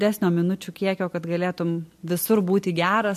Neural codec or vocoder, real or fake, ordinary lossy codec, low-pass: none; real; MP3, 64 kbps; 14.4 kHz